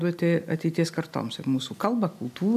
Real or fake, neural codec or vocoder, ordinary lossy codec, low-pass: real; none; AAC, 96 kbps; 14.4 kHz